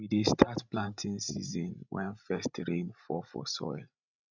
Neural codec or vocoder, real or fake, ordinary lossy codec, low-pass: codec, 16 kHz, 16 kbps, FreqCodec, larger model; fake; none; 7.2 kHz